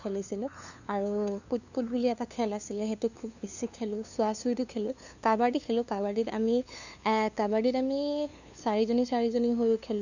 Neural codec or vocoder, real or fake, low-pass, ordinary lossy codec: codec, 16 kHz, 2 kbps, FunCodec, trained on LibriTTS, 25 frames a second; fake; 7.2 kHz; none